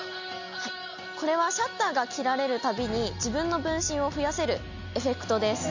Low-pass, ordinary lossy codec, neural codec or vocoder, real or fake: 7.2 kHz; none; none; real